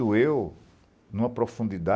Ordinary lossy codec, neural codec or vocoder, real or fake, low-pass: none; none; real; none